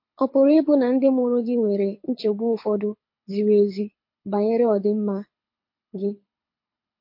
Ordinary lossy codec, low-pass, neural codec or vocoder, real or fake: MP3, 32 kbps; 5.4 kHz; codec, 24 kHz, 6 kbps, HILCodec; fake